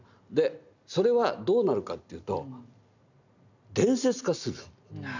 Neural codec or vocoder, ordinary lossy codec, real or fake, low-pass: none; none; real; 7.2 kHz